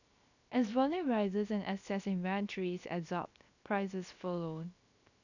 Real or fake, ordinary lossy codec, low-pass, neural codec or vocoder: fake; none; 7.2 kHz; codec, 16 kHz, 0.7 kbps, FocalCodec